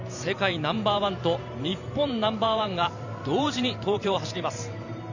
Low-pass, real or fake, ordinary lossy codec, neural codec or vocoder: 7.2 kHz; fake; none; vocoder, 44.1 kHz, 128 mel bands every 512 samples, BigVGAN v2